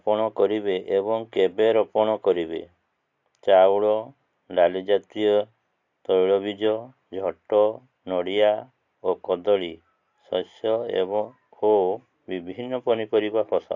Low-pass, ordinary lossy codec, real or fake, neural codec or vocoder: 7.2 kHz; AAC, 48 kbps; real; none